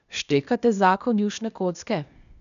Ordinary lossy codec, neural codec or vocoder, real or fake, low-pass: none; codec, 16 kHz, 0.8 kbps, ZipCodec; fake; 7.2 kHz